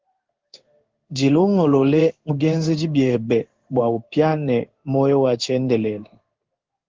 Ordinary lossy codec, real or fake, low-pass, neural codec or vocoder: Opus, 16 kbps; fake; 7.2 kHz; codec, 16 kHz in and 24 kHz out, 1 kbps, XY-Tokenizer